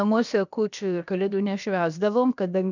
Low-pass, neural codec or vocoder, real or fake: 7.2 kHz; codec, 16 kHz, about 1 kbps, DyCAST, with the encoder's durations; fake